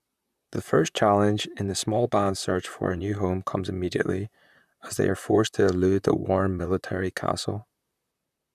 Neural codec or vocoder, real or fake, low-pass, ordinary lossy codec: vocoder, 44.1 kHz, 128 mel bands, Pupu-Vocoder; fake; 14.4 kHz; none